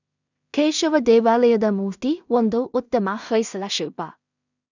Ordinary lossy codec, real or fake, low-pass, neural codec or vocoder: none; fake; 7.2 kHz; codec, 16 kHz in and 24 kHz out, 0.4 kbps, LongCat-Audio-Codec, two codebook decoder